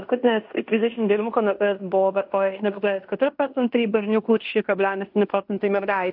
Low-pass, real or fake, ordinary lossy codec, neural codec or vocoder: 5.4 kHz; fake; AAC, 48 kbps; codec, 16 kHz in and 24 kHz out, 0.9 kbps, LongCat-Audio-Codec, fine tuned four codebook decoder